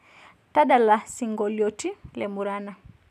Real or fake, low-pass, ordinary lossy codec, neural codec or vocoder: real; 14.4 kHz; none; none